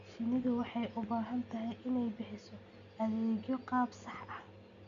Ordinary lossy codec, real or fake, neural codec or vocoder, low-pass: Opus, 64 kbps; real; none; 7.2 kHz